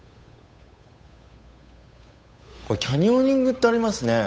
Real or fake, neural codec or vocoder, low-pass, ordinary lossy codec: fake; codec, 16 kHz, 8 kbps, FunCodec, trained on Chinese and English, 25 frames a second; none; none